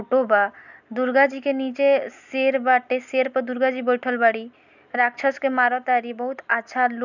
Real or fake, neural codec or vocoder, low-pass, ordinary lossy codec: real; none; 7.2 kHz; none